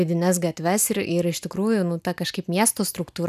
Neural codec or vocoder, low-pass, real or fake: none; 14.4 kHz; real